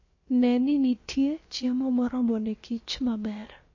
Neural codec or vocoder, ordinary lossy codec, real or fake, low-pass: codec, 16 kHz, about 1 kbps, DyCAST, with the encoder's durations; MP3, 32 kbps; fake; 7.2 kHz